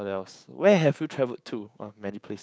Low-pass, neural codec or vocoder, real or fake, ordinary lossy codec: none; codec, 16 kHz, 6 kbps, DAC; fake; none